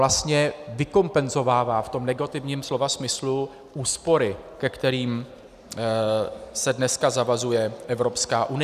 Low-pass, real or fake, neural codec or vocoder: 14.4 kHz; real; none